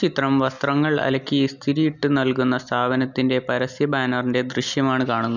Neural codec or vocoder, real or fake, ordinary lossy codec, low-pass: none; real; none; 7.2 kHz